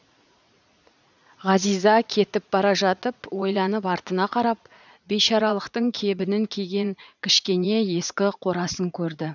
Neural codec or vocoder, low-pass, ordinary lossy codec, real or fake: vocoder, 44.1 kHz, 80 mel bands, Vocos; 7.2 kHz; none; fake